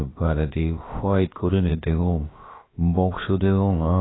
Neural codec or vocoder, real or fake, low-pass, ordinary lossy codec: codec, 16 kHz, about 1 kbps, DyCAST, with the encoder's durations; fake; 7.2 kHz; AAC, 16 kbps